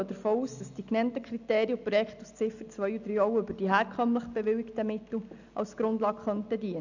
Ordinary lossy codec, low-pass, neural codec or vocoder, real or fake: none; 7.2 kHz; none; real